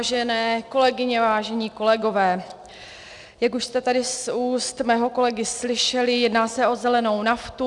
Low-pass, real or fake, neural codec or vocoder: 10.8 kHz; real; none